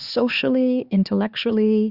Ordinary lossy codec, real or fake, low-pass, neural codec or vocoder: Opus, 64 kbps; fake; 5.4 kHz; codec, 16 kHz, 2 kbps, X-Codec, HuBERT features, trained on LibriSpeech